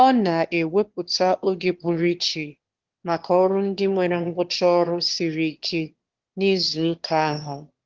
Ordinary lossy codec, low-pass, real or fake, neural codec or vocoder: Opus, 16 kbps; 7.2 kHz; fake; autoencoder, 22.05 kHz, a latent of 192 numbers a frame, VITS, trained on one speaker